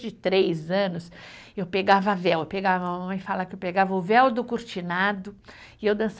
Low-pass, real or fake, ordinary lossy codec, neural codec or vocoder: none; real; none; none